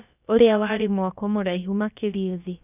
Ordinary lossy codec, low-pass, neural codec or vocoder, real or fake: none; 3.6 kHz; codec, 16 kHz, about 1 kbps, DyCAST, with the encoder's durations; fake